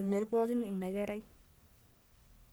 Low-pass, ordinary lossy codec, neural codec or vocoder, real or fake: none; none; codec, 44.1 kHz, 1.7 kbps, Pupu-Codec; fake